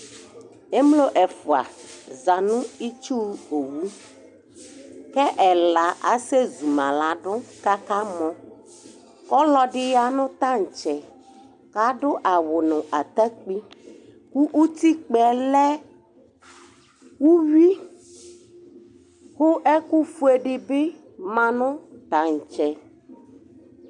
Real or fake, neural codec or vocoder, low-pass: real; none; 10.8 kHz